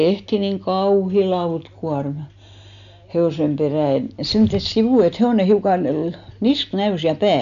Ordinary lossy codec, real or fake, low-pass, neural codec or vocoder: AAC, 96 kbps; real; 7.2 kHz; none